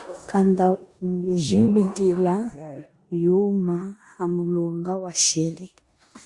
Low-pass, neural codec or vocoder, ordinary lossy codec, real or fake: 10.8 kHz; codec, 16 kHz in and 24 kHz out, 0.9 kbps, LongCat-Audio-Codec, four codebook decoder; Opus, 64 kbps; fake